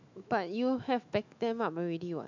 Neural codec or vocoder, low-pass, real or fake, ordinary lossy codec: autoencoder, 48 kHz, 128 numbers a frame, DAC-VAE, trained on Japanese speech; 7.2 kHz; fake; MP3, 64 kbps